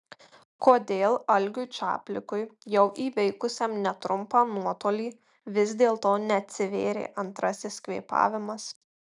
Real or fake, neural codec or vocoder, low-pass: real; none; 10.8 kHz